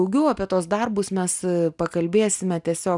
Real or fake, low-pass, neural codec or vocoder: real; 10.8 kHz; none